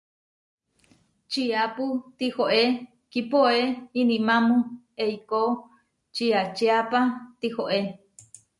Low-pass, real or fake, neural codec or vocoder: 10.8 kHz; real; none